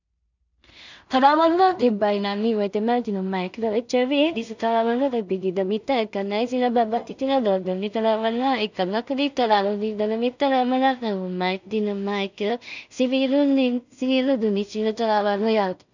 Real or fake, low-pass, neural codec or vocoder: fake; 7.2 kHz; codec, 16 kHz in and 24 kHz out, 0.4 kbps, LongCat-Audio-Codec, two codebook decoder